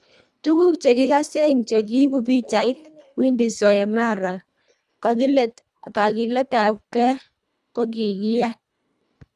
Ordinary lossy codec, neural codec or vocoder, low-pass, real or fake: none; codec, 24 kHz, 1.5 kbps, HILCodec; none; fake